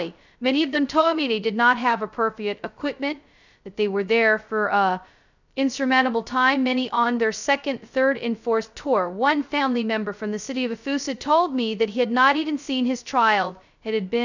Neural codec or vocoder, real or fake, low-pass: codec, 16 kHz, 0.2 kbps, FocalCodec; fake; 7.2 kHz